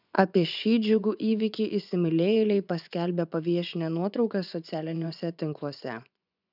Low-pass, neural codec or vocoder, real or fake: 5.4 kHz; vocoder, 24 kHz, 100 mel bands, Vocos; fake